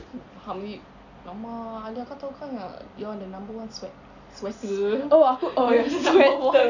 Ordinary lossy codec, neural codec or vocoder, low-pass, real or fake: none; none; 7.2 kHz; real